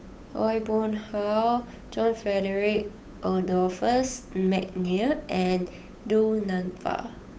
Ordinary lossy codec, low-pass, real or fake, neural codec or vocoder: none; none; fake; codec, 16 kHz, 8 kbps, FunCodec, trained on Chinese and English, 25 frames a second